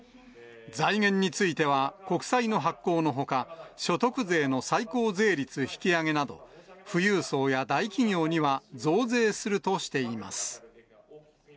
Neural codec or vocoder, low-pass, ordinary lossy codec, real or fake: none; none; none; real